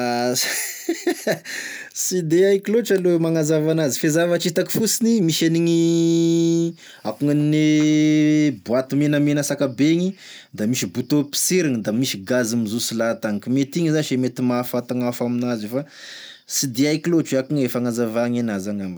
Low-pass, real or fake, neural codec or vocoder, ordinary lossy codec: none; real; none; none